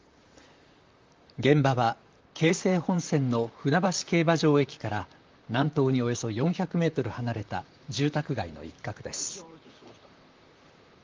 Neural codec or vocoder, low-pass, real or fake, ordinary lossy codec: vocoder, 44.1 kHz, 128 mel bands, Pupu-Vocoder; 7.2 kHz; fake; Opus, 32 kbps